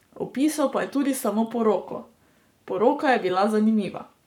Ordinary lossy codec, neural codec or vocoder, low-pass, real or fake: none; codec, 44.1 kHz, 7.8 kbps, Pupu-Codec; 19.8 kHz; fake